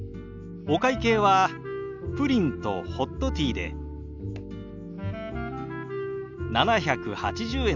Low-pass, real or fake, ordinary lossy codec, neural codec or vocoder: 7.2 kHz; real; none; none